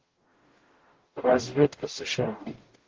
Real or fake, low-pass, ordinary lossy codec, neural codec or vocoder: fake; 7.2 kHz; Opus, 16 kbps; codec, 44.1 kHz, 0.9 kbps, DAC